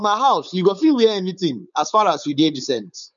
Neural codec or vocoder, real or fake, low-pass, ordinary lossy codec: codec, 16 kHz, 4.8 kbps, FACodec; fake; 7.2 kHz; none